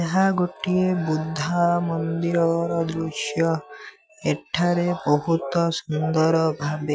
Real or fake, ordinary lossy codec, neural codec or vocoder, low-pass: real; none; none; none